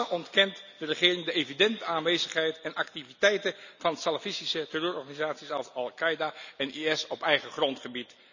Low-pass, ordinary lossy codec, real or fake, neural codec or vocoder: 7.2 kHz; none; real; none